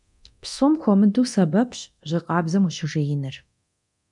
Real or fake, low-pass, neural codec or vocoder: fake; 10.8 kHz; codec, 24 kHz, 0.9 kbps, DualCodec